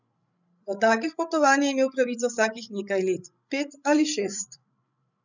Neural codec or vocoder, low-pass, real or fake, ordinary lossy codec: codec, 16 kHz, 16 kbps, FreqCodec, larger model; 7.2 kHz; fake; none